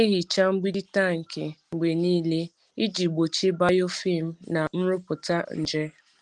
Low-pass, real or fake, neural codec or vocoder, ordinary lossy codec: 10.8 kHz; real; none; Opus, 24 kbps